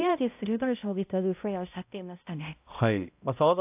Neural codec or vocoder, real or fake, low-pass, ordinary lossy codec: codec, 16 kHz, 0.5 kbps, X-Codec, HuBERT features, trained on balanced general audio; fake; 3.6 kHz; AAC, 32 kbps